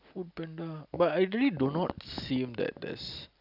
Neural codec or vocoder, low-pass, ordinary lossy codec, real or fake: none; 5.4 kHz; none; real